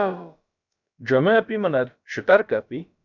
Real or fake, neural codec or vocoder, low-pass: fake; codec, 16 kHz, about 1 kbps, DyCAST, with the encoder's durations; 7.2 kHz